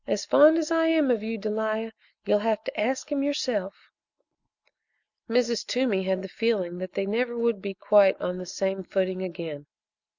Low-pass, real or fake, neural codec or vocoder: 7.2 kHz; real; none